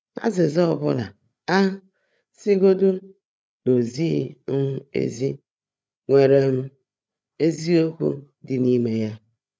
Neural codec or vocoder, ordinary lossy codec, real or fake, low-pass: codec, 16 kHz, 8 kbps, FreqCodec, larger model; none; fake; none